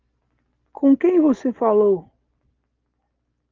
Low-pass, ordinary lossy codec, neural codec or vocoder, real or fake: 7.2 kHz; Opus, 32 kbps; none; real